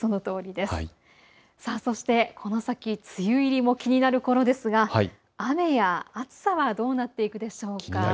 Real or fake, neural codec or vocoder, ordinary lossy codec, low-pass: real; none; none; none